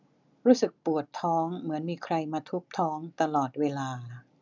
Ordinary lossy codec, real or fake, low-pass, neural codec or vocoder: none; real; 7.2 kHz; none